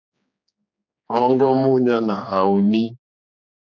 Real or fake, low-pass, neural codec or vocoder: fake; 7.2 kHz; codec, 16 kHz, 2 kbps, X-Codec, HuBERT features, trained on general audio